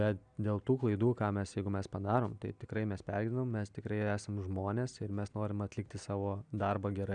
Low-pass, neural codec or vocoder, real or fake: 9.9 kHz; none; real